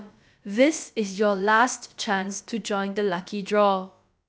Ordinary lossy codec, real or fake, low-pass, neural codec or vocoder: none; fake; none; codec, 16 kHz, about 1 kbps, DyCAST, with the encoder's durations